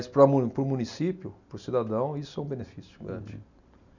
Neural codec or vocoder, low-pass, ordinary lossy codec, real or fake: none; 7.2 kHz; none; real